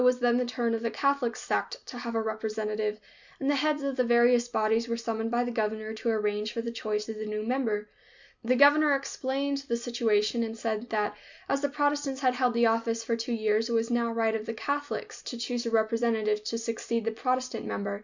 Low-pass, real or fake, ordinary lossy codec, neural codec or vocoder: 7.2 kHz; real; Opus, 64 kbps; none